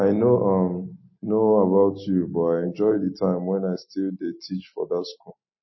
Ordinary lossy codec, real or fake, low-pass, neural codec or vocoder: MP3, 24 kbps; real; 7.2 kHz; none